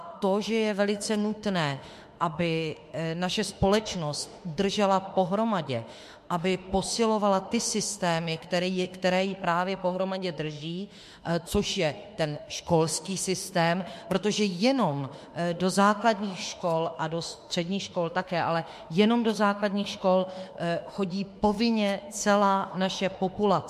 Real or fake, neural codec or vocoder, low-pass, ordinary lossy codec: fake; autoencoder, 48 kHz, 32 numbers a frame, DAC-VAE, trained on Japanese speech; 14.4 kHz; MP3, 64 kbps